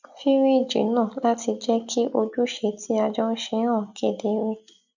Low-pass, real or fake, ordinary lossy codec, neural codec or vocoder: 7.2 kHz; real; none; none